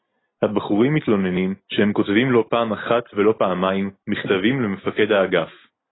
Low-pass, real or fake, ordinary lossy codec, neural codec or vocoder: 7.2 kHz; real; AAC, 16 kbps; none